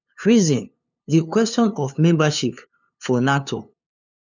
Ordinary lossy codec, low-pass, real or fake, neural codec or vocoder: none; 7.2 kHz; fake; codec, 16 kHz, 2 kbps, FunCodec, trained on LibriTTS, 25 frames a second